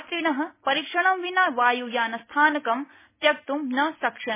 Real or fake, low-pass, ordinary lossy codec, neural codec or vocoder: real; 3.6 kHz; MP3, 16 kbps; none